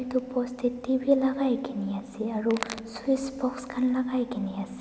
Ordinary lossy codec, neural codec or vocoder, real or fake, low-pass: none; none; real; none